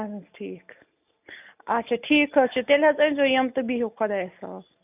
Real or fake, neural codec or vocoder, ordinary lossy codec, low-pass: real; none; none; 3.6 kHz